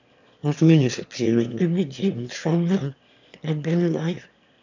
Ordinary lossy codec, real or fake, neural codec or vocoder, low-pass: none; fake; autoencoder, 22.05 kHz, a latent of 192 numbers a frame, VITS, trained on one speaker; 7.2 kHz